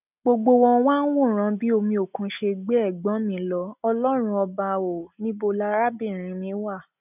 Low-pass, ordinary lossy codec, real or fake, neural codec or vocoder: 3.6 kHz; none; real; none